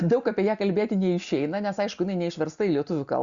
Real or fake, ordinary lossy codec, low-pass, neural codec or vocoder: real; Opus, 64 kbps; 7.2 kHz; none